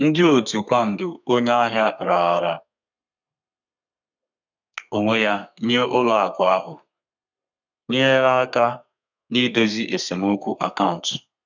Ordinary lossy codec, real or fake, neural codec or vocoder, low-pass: none; fake; codec, 32 kHz, 1.9 kbps, SNAC; 7.2 kHz